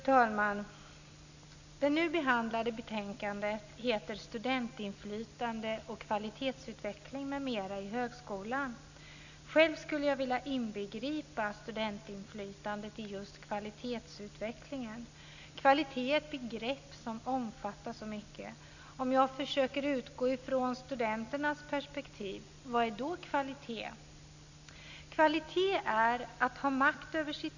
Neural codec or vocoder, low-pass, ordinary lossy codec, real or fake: none; 7.2 kHz; none; real